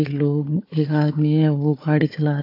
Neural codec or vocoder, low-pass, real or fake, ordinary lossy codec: codec, 16 kHz, 4 kbps, FunCodec, trained on Chinese and English, 50 frames a second; 5.4 kHz; fake; AAC, 32 kbps